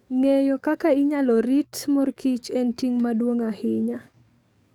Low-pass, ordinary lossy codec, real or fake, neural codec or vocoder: 19.8 kHz; none; fake; codec, 44.1 kHz, 7.8 kbps, DAC